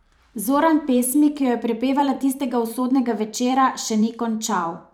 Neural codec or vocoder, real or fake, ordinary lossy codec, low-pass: vocoder, 44.1 kHz, 128 mel bands every 512 samples, BigVGAN v2; fake; none; 19.8 kHz